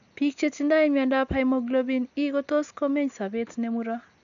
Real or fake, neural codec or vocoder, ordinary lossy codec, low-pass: real; none; none; 7.2 kHz